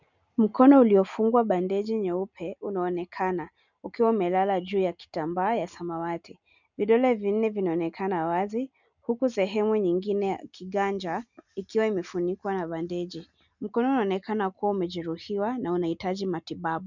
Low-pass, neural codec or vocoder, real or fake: 7.2 kHz; none; real